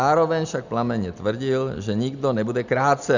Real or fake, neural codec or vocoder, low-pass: real; none; 7.2 kHz